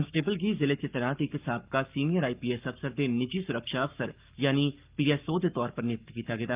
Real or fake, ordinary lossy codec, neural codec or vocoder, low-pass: fake; Opus, 24 kbps; codec, 44.1 kHz, 7.8 kbps, Pupu-Codec; 3.6 kHz